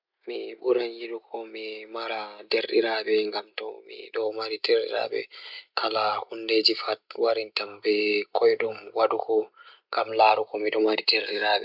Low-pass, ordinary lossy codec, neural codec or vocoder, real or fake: 5.4 kHz; none; none; real